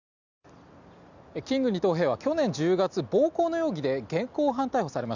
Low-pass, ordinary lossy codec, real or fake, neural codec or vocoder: 7.2 kHz; none; real; none